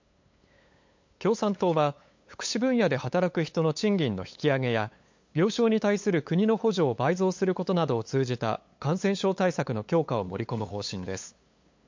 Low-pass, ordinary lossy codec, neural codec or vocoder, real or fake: 7.2 kHz; MP3, 48 kbps; codec, 16 kHz, 8 kbps, FunCodec, trained on LibriTTS, 25 frames a second; fake